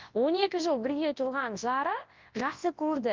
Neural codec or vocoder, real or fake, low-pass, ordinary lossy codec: codec, 24 kHz, 0.9 kbps, WavTokenizer, large speech release; fake; 7.2 kHz; Opus, 32 kbps